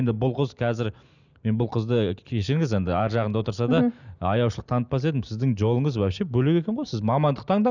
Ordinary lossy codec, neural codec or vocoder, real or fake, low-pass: none; none; real; 7.2 kHz